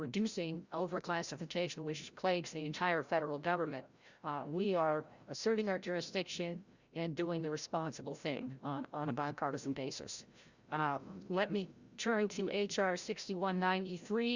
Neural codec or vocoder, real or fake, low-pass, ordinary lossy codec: codec, 16 kHz, 0.5 kbps, FreqCodec, larger model; fake; 7.2 kHz; Opus, 64 kbps